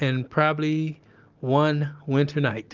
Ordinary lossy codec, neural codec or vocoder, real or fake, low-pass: Opus, 24 kbps; none; real; 7.2 kHz